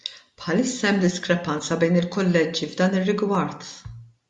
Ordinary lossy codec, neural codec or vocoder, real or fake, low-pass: MP3, 96 kbps; none; real; 10.8 kHz